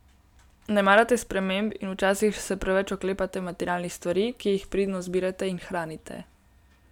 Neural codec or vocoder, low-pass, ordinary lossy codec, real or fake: none; 19.8 kHz; none; real